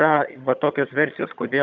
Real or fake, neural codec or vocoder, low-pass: fake; vocoder, 22.05 kHz, 80 mel bands, HiFi-GAN; 7.2 kHz